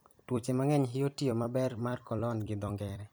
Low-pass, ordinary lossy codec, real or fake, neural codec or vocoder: none; none; fake; vocoder, 44.1 kHz, 128 mel bands every 256 samples, BigVGAN v2